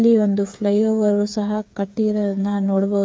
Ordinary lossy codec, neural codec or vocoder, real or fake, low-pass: none; codec, 16 kHz, 8 kbps, FreqCodec, smaller model; fake; none